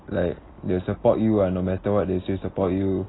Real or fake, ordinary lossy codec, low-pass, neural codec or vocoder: real; AAC, 16 kbps; 7.2 kHz; none